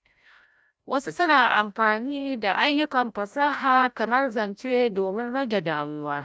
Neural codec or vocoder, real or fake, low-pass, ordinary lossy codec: codec, 16 kHz, 0.5 kbps, FreqCodec, larger model; fake; none; none